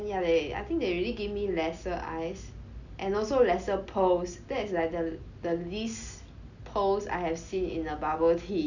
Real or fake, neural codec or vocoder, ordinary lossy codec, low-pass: real; none; none; 7.2 kHz